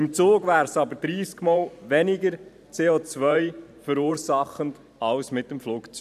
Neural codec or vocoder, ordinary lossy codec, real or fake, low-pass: vocoder, 44.1 kHz, 128 mel bands every 512 samples, BigVGAN v2; none; fake; 14.4 kHz